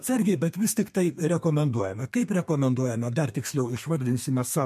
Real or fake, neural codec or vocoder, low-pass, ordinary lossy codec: fake; codec, 32 kHz, 1.9 kbps, SNAC; 14.4 kHz; MP3, 64 kbps